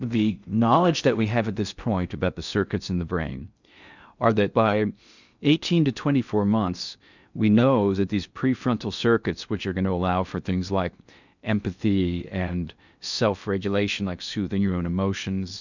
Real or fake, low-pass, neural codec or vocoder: fake; 7.2 kHz; codec, 16 kHz in and 24 kHz out, 0.6 kbps, FocalCodec, streaming, 4096 codes